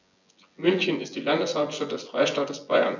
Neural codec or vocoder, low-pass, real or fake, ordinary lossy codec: vocoder, 24 kHz, 100 mel bands, Vocos; 7.2 kHz; fake; none